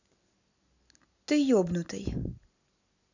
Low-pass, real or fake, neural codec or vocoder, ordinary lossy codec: 7.2 kHz; real; none; none